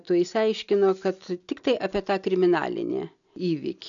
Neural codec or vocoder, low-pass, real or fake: none; 7.2 kHz; real